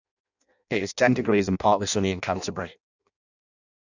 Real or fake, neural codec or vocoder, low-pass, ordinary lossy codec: fake; codec, 16 kHz in and 24 kHz out, 0.6 kbps, FireRedTTS-2 codec; 7.2 kHz; none